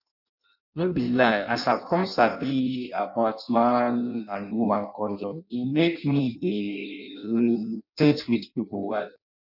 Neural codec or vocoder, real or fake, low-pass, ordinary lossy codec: codec, 16 kHz in and 24 kHz out, 0.6 kbps, FireRedTTS-2 codec; fake; 5.4 kHz; none